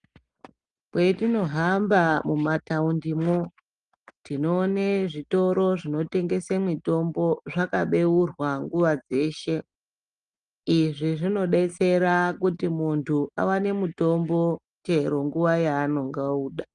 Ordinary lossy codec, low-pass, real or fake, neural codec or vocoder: Opus, 32 kbps; 9.9 kHz; real; none